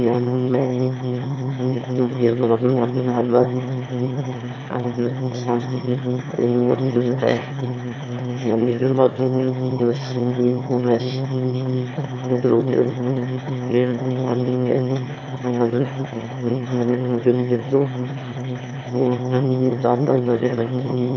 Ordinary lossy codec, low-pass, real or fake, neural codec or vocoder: none; 7.2 kHz; fake; autoencoder, 22.05 kHz, a latent of 192 numbers a frame, VITS, trained on one speaker